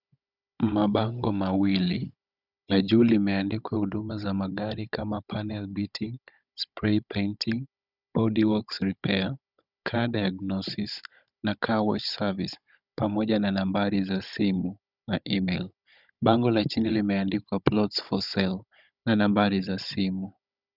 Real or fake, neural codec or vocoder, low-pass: fake; codec, 16 kHz, 16 kbps, FunCodec, trained on Chinese and English, 50 frames a second; 5.4 kHz